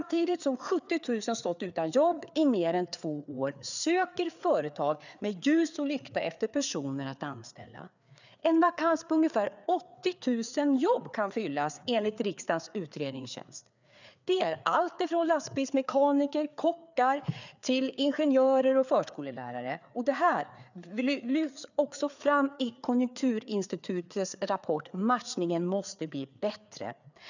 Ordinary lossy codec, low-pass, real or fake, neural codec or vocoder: none; 7.2 kHz; fake; codec, 16 kHz, 4 kbps, FreqCodec, larger model